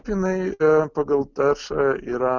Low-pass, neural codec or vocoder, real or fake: 7.2 kHz; none; real